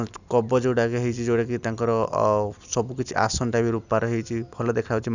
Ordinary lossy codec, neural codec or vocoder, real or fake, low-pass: none; none; real; 7.2 kHz